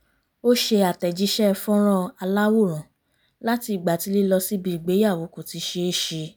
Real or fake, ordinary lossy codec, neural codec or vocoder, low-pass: real; none; none; none